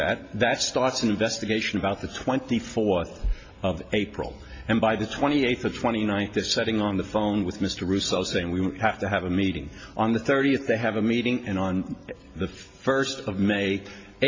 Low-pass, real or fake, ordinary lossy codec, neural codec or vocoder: 7.2 kHz; real; MP3, 32 kbps; none